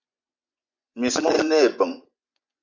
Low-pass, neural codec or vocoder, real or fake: 7.2 kHz; vocoder, 24 kHz, 100 mel bands, Vocos; fake